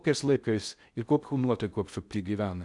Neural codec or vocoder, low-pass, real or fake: codec, 16 kHz in and 24 kHz out, 0.6 kbps, FocalCodec, streaming, 4096 codes; 10.8 kHz; fake